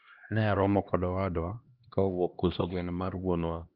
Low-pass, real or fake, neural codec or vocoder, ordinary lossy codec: 5.4 kHz; fake; codec, 16 kHz, 1 kbps, X-Codec, HuBERT features, trained on LibriSpeech; Opus, 32 kbps